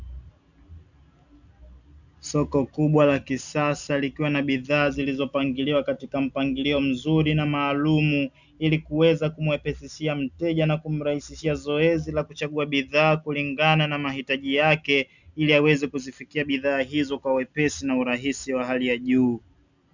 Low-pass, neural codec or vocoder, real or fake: 7.2 kHz; none; real